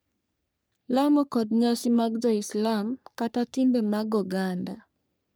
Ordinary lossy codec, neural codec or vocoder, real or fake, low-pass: none; codec, 44.1 kHz, 3.4 kbps, Pupu-Codec; fake; none